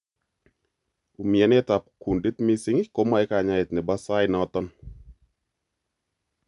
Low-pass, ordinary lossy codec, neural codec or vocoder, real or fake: 10.8 kHz; none; none; real